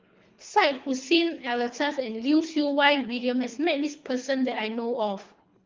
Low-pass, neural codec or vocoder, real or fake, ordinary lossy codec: 7.2 kHz; codec, 24 kHz, 3 kbps, HILCodec; fake; Opus, 24 kbps